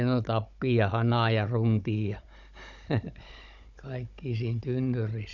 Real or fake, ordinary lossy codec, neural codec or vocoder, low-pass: fake; none; codec, 16 kHz, 16 kbps, FunCodec, trained on Chinese and English, 50 frames a second; 7.2 kHz